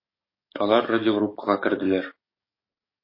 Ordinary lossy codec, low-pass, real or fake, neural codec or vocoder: MP3, 24 kbps; 5.4 kHz; fake; codec, 44.1 kHz, 7.8 kbps, DAC